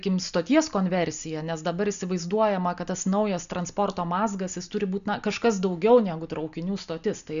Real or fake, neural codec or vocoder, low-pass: real; none; 7.2 kHz